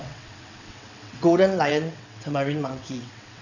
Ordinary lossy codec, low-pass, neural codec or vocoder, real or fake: none; 7.2 kHz; vocoder, 22.05 kHz, 80 mel bands, WaveNeXt; fake